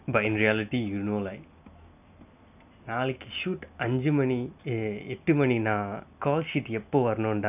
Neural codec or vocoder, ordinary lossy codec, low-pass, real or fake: none; none; 3.6 kHz; real